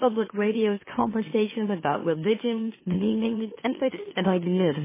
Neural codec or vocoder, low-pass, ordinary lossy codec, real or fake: autoencoder, 44.1 kHz, a latent of 192 numbers a frame, MeloTTS; 3.6 kHz; MP3, 16 kbps; fake